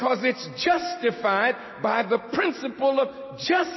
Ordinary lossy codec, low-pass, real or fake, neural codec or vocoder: MP3, 24 kbps; 7.2 kHz; real; none